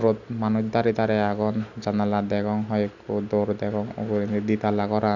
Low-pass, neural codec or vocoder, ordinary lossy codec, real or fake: 7.2 kHz; none; none; real